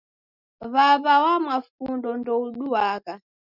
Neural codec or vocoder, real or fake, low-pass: none; real; 5.4 kHz